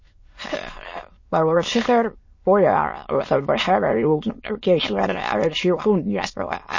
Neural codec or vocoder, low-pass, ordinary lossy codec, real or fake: autoencoder, 22.05 kHz, a latent of 192 numbers a frame, VITS, trained on many speakers; 7.2 kHz; MP3, 32 kbps; fake